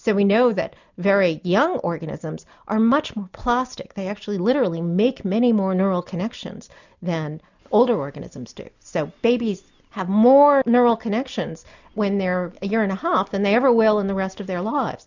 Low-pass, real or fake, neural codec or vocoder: 7.2 kHz; real; none